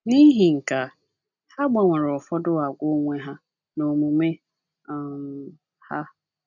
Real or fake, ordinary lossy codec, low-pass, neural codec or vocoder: real; none; 7.2 kHz; none